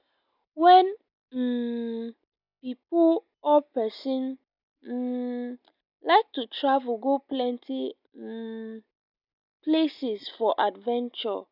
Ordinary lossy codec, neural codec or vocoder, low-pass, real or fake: none; none; 5.4 kHz; real